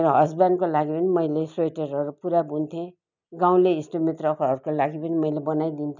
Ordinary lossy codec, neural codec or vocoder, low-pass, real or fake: none; none; 7.2 kHz; real